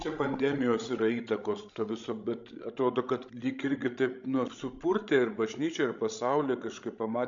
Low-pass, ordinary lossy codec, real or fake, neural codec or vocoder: 7.2 kHz; MP3, 64 kbps; fake; codec, 16 kHz, 16 kbps, FreqCodec, larger model